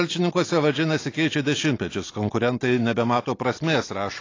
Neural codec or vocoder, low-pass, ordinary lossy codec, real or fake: none; 7.2 kHz; AAC, 32 kbps; real